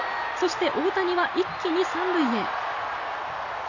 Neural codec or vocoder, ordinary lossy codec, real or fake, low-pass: none; none; real; 7.2 kHz